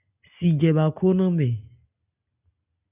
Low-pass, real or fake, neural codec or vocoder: 3.6 kHz; real; none